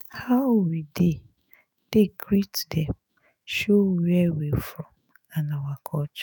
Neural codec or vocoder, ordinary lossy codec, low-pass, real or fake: autoencoder, 48 kHz, 128 numbers a frame, DAC-VAE, trained on Japanese speech; none; none; fake